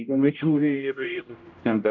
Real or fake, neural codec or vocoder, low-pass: fake; codec, 16 kHz, 0.5 kbps, X-Codec, HuBERT features, trained on general audio; 7.2 kHz